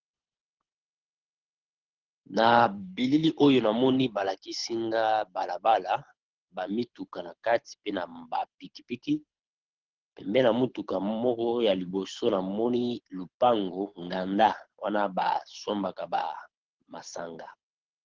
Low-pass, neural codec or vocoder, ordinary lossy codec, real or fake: 7.2 kHz; codec, 24 kHz, 6 kbps, HILCodec; Opus, 16 kbps; fake